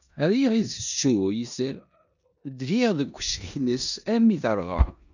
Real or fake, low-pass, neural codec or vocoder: fake; 7.2 kHz; codec, 16 kHz in and 24 kHz out, 0.9 kbps, LongCat-Audio-Codec, four codebook decoder